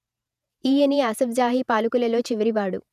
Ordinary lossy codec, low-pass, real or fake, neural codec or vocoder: none; 14.4 kHz; fake; vocoder, 48 kHz, 128 mel bands, Vocos